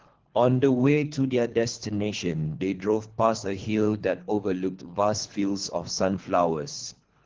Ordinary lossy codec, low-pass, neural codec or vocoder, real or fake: Opus, 16 kbps; 7.2 kHz; codec, 24 kHz, 3 kbps, HILCodec; fake